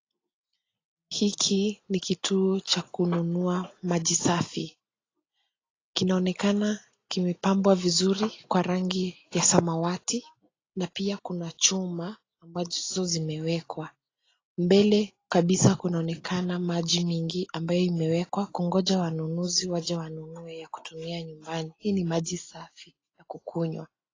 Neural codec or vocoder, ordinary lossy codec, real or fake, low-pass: none; AAC, 32 kbps; real; 7.2 kHz